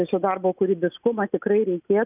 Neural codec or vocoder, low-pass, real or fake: none; 3.6 kHz; real